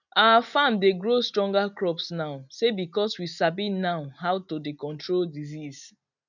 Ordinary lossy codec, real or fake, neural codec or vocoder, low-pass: none; real; none; 7.2 kHz